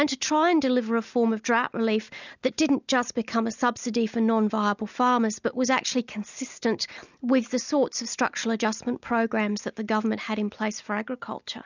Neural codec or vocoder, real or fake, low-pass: none; real; 7.2 kHz